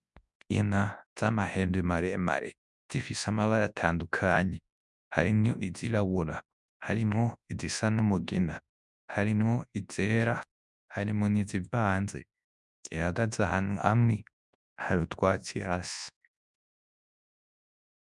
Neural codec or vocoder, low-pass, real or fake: codec, 24 kHz, 0.9 kbps, WavTokenizer, large speech release; 10.8 kHz; fake